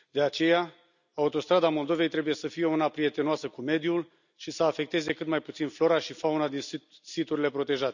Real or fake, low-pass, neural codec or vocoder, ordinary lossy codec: real; 7.2 kHz; none; none